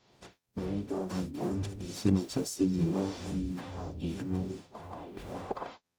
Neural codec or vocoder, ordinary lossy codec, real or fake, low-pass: codec, 44.1 kHz, 0.9 kbps, DAC; none; fake; none